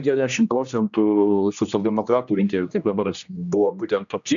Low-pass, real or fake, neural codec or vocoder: 7.2 kHz; fake; codec, 16 kHz, 1 kbps, X-Codec, HuBERT features, trained on general audio